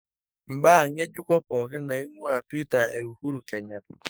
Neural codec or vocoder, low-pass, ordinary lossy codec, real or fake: codec, 44.1 kHz, 2.6 kbps, SNAC; none; none; fake